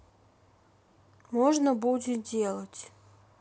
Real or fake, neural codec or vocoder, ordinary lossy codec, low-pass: real; none; none; none